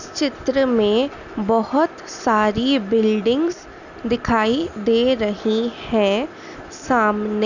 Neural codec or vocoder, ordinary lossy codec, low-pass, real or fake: none; none; 7.2 kHz; real